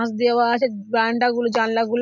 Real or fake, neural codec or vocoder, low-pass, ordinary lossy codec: real; none; 7.2 kHz; none